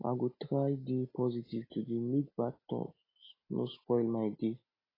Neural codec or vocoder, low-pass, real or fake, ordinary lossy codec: none; 5.4 kHz; real; AAC, 24 kbps